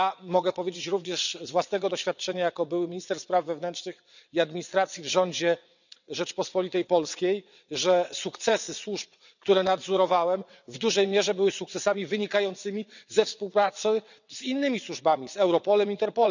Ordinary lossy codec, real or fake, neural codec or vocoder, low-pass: none; fake; vocoder, 22.05 kHz, 80 mel bands, WaveNeXt; 7.2 kHz